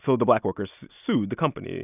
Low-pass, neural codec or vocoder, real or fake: 3.6 kHz; none; real